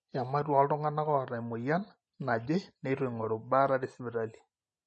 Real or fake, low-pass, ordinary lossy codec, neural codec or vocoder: real; 10.8 kHz; MP3, 32 kbps; none